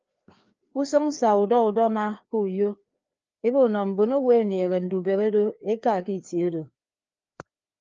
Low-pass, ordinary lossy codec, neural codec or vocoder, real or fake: 7.2 kHz; Opus, 24 kbps; codec, 16 kHz, 2 kbps, FreqCodec, larger model; fake